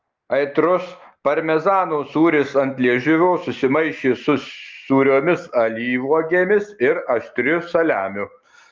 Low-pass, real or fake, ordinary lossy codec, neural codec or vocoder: 7.2 kHz; real; Opus, 32 kbps; none